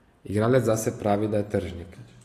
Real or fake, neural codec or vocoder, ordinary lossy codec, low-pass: real; none; AAC, 48 kbps; 14.4 kHz